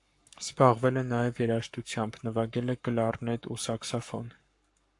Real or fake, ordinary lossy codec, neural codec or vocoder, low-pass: fake; AAC, 64 kbps; codec, 44.1 kHz, 7.8 kbps, Pupu-Codec; 10.8 kHz